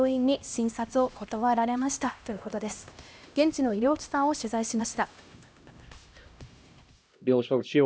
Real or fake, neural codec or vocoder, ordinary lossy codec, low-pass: fake; codec, 16 kHz, 1 kbps, X-Codec, HuBERT features, trained on LibriSpeech; none; none